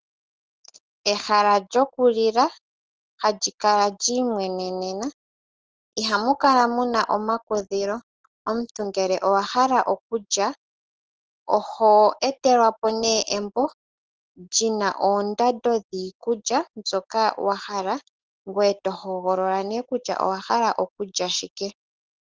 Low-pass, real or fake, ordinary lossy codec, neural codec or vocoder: 7.2 kHz; real; Opus, 16 kbps; none